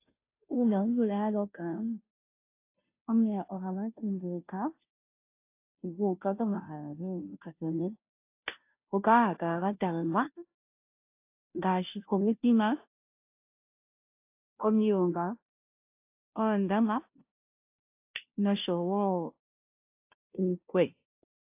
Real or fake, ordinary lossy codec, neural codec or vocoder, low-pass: fake; AAC, 24 kbps; codec, 16 kHz, 0.5 kbps, FunCodec, trained on Chinese and English, 25 frames a second; 3.6 kHz